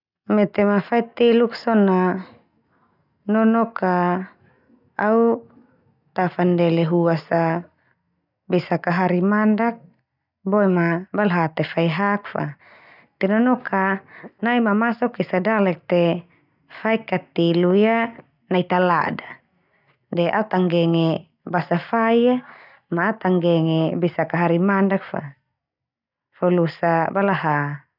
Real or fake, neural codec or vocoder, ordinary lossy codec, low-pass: real; none; none; 5.4 kHz